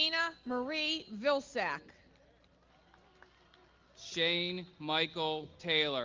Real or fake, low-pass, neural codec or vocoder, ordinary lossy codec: real; 7.2 kHz; none; Opus, 24 kbps